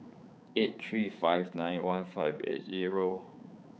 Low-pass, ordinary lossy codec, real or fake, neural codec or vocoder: none; none; fake; codec, 16 kHz, 4 kbps, X-Codec, HuBERT features, trained on balanced general audio